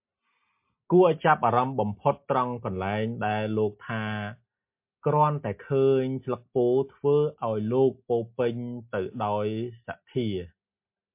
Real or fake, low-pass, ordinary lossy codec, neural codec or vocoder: real; 3.6 kHz; MP3, 32 kbps; none